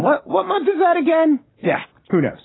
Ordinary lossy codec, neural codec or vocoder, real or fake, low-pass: AAC, 16 kbps; vocoder, 44.1 kHz, 128 mel bands, Pupu-Vocoder; fake; 7.2 kHz